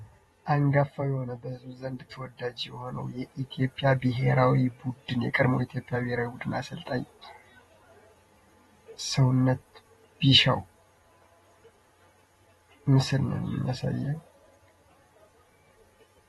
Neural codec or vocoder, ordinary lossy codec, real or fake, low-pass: vocoder, 48 kHz, 128 mel bands, Vocos; AAC, 32 kbps; fake; 19.8 kHz